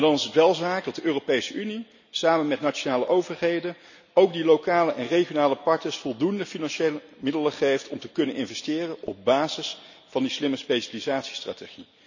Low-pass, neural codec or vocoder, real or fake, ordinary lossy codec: 7.2 kHz; none; real; none